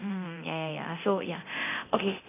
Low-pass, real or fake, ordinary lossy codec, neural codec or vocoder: 3.6 kHz; fake; none; codec, 24 kHz, 0.9 kbps, DualCodec